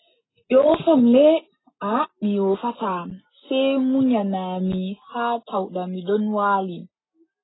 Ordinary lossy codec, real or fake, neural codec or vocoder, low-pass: AAC, 16 kbps; real; none; 7.2 kHz